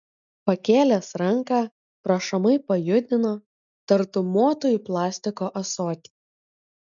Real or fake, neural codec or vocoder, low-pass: real; none; 7.2 kHz